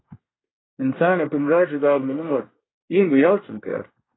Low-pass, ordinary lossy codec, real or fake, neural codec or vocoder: 7.2 kHz; AAC, 16 kbps; fake; codec, 24 kHz, 1 kbps, SNAC